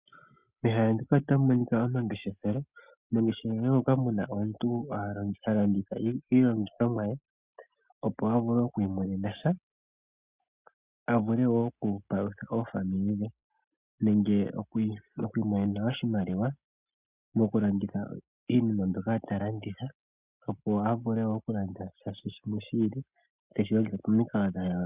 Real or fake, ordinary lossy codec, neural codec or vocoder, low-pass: real; Opus, 64 kbps; none; 3.6 kHz